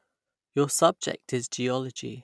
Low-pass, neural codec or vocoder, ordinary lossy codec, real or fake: none; none; none; real